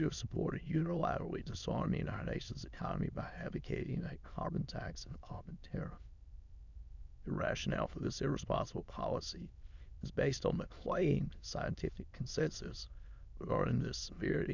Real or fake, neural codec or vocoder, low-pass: fake; autoencoder, 22.05 kHz, a latent of 192 numbers a frame, VITS, trained on many speakers; 7.2 kHz